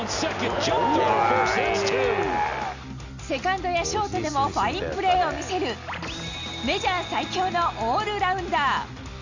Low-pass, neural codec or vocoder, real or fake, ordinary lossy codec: 7.2 kHz; none; real; Opus, 64 kbps